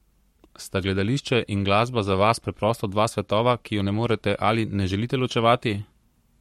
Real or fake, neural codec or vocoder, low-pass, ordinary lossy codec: fake; codec, 44.1 kHz, 7.8 kbps, Pupu-Codec; 19.8 kHz; MP3, 64 kbps